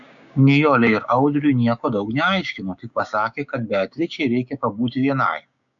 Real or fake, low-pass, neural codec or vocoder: fake; 7.2 kHz; codec, 16 kHz, 6 kbps, DAC